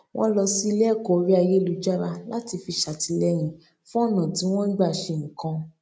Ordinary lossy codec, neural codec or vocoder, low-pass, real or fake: none; none; none; real